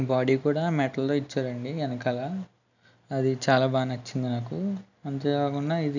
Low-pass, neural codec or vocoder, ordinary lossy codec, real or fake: 7.2 kHz; none; none; real